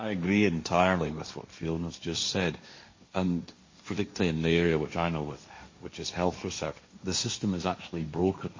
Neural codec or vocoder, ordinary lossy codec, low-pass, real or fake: codec, 16 kHz, 1.1 kbps, Voila-Tokenizer; MP3, 32 kbps; 7.2 kHz; fake